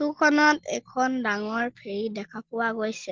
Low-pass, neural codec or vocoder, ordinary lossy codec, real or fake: 7.2 kHz; none; Opus, 16 kbps; real